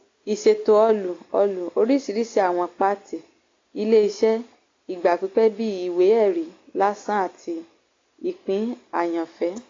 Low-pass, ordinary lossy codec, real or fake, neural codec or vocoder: 7.2 kHz; AAC, 32 kbps; real; none